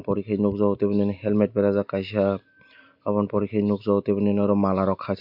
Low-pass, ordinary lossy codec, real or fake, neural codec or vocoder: 5.4 kHz; MP3, 48 kbps; real; none